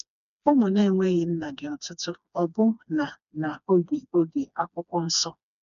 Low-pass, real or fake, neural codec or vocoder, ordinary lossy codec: 7.2 kHz; fake; codec, 16 kHz, 2 kbps, FreqCodec, smaller model; none